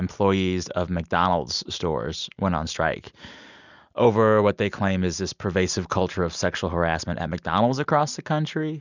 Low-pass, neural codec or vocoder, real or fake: 7.2 kHz; none; real